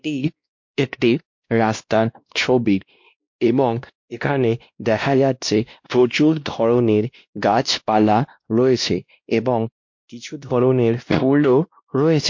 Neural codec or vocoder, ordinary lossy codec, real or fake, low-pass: codec, 16 kHz, 1 kbps, X-Codec, WavLM features, trained on Multilingual LibriSpeech; MP3, 48 kbps; fake; 7.2 kHz